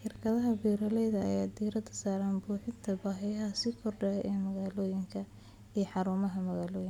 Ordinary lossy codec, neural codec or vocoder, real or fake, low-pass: none; none; real; 19.8 kHz